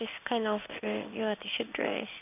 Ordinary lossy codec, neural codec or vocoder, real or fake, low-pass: none; codec, 16 kHz in and 24 kHz out, 1 kbps, XY-Tokenizer; fake; 3.6 kHz